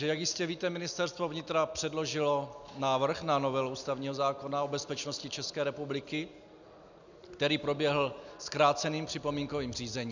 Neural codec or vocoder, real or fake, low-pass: none; real; 7.2 kHz